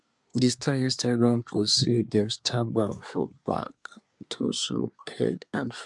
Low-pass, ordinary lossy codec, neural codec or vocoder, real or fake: 10.8 kHz; none; codec, 24 kHz, 1 kbps, SNAC; fake